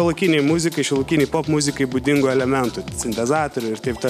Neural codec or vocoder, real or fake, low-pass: none; real; 14.4 kHz